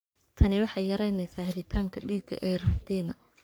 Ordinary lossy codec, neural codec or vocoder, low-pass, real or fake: none; codec, 44.1 kHz, 3.4 kbps, Pupu-Codec; none; fake